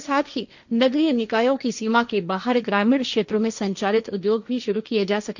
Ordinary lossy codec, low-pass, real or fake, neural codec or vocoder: none; 7.2 kHz; fake; codec, 16 kHz, 1.1 kbps, Voila-Tokenizer